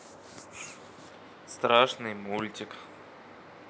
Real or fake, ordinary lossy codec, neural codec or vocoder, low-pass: real; none; none; none